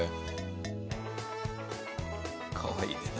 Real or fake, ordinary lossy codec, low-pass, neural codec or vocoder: real; none; none; none